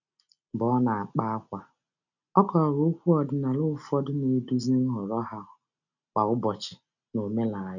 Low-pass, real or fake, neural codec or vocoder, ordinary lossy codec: 7.2 kHz; real; none; none